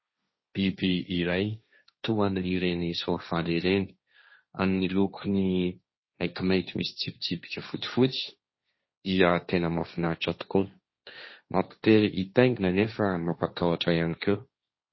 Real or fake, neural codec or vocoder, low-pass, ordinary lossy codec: fake; codec, 16 kHz, 1.1 kbps, Voila-Tokenizer; 7.2 kHz; MP3, 24 kbps